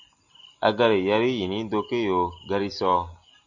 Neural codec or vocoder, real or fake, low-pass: none; real; 7.2 kHz